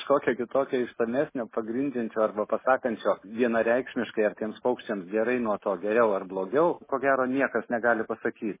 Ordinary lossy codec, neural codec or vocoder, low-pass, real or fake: MP3, 16 kbps; none; 3.6 kHz; real